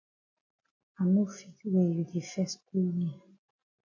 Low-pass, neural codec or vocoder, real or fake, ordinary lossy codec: 7.2 kHz; none; real; MP3, 48 kbps